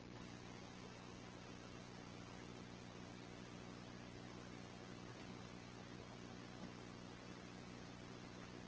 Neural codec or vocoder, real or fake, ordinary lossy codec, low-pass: none; real; Opus, 16 kbps; 7.2 kHz